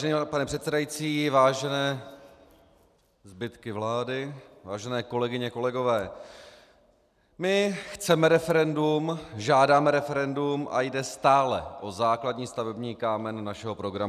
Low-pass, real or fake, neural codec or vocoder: 14.4 kHz; real; none